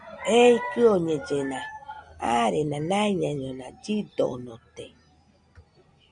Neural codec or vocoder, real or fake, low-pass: none; real; 9.9 kHz